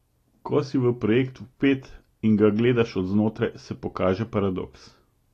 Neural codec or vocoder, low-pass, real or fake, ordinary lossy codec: none; 14.4 kHz; real; AAC, 48 kbps